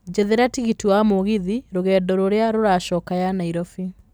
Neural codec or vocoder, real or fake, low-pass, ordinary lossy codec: vocoder, 44.1 kHz, 128 mel bands every 256 samples, BigVGAN v2; fake; none; none